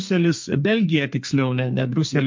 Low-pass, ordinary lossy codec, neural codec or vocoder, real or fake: 7.2 kHz; MP3, 48 kbps; codec, 32 kHz, 1.9 kbps, SNAC; fake